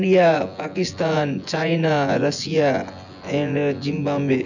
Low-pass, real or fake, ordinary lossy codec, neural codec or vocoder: 7.2 kHz; fake; none; vocoder, 24 kHz, 100 mel bands, Vocos